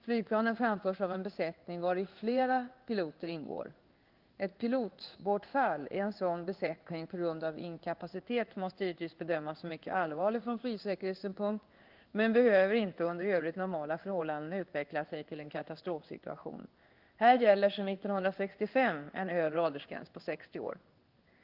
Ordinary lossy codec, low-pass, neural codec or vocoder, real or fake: Opus, 32 kbps; 5.4 kHz; codec, 16 kHz in and 24 kHz out, 1 kbps, XY-Tokenizer; fake